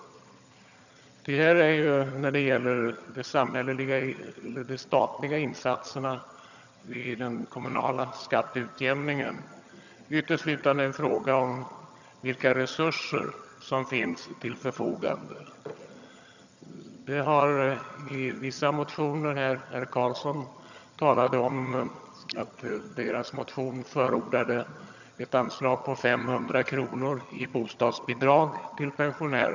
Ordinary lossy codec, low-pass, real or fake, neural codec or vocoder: none; 7.2 kHz; fake; vocoder, 22.05 kHz, 80 mel bands, HiFi-GAN